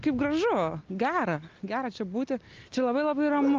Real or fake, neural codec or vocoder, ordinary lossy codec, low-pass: real; none; Opus, 32 kbps; 7.2 kHz